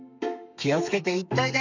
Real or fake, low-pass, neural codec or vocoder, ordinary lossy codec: fake; 7.2 kHz; codec, 32 kHz, 1.9 kbps, SNAC; none